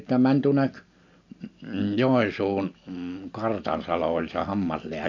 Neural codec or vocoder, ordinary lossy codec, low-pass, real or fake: none; none; 7.2 kHz; real